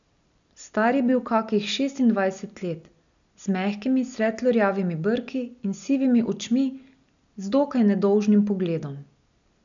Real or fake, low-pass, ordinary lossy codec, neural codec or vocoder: real; 7.2 kHz; none; none